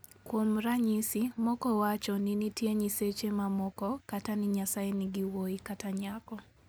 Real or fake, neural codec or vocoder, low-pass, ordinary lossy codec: real; none; none; none